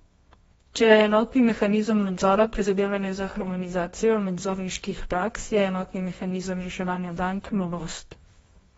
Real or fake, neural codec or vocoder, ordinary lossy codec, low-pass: fake; codec, 24 kHz, 0.9 kbps, WavTokenizer, medium music audio release; AAC, 24 kbps; 10.8 kHz